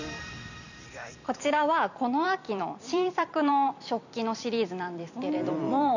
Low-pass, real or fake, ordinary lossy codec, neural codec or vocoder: 7.2 kHz; fake; none; vocoder, 44.1 kHz, 128 mel bands every 256 samples, BigVGAN v2